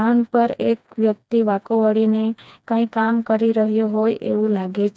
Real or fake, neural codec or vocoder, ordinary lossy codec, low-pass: fake; codec, 16 kHz, 2 kbps, FreqCodec, smaller model; none; none